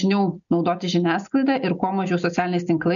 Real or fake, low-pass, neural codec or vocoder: real; 7.2 kHz; none